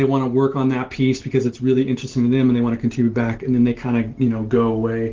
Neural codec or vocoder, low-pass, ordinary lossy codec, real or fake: none; 7.2 kHz; Opus, 16 kbps; real